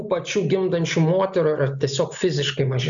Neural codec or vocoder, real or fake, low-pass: none; real; 7.2 kHz